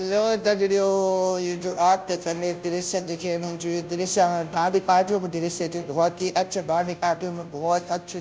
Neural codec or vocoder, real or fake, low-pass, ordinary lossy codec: codec, 16 kHz, 0.5 kbps, FunCodec, trained on Chinese and English, 25 frames a second; fake; none; none